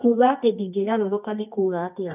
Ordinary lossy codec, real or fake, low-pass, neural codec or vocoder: none; fake; 3.6 kHz; codec, 24 kHz, 0.9 kbps, WavTokenizer, medium music audio release